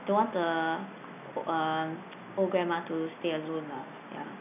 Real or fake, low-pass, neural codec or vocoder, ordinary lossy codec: real; 3.6 kHz; none; none